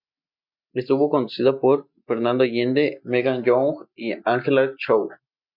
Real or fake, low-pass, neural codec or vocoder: real; 5.4 kHz; none